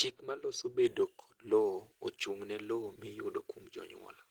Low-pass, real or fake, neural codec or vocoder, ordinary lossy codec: 19.8 kHz; real; none; Opus, 16 kbps